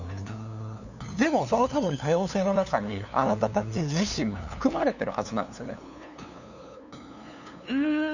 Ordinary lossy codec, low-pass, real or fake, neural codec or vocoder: none; 7.2 kHz; fake; codec, 16 kHz, 2 kbps, FunCodec, trained on LibriTTS, 25 frames a second